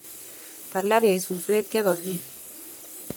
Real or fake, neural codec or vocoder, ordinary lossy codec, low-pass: fake; codec, 44.1 kHz, 1.7 kbps, Pupu-Codec; none; none